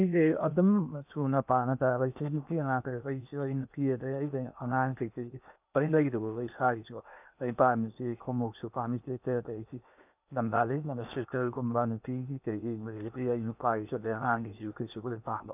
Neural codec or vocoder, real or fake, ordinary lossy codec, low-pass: codec, 16 kHz in and 24 kHz out, 0.8 kbps, FocalCodec, streaming, 65536 codes; fake; none; 3.6 kHz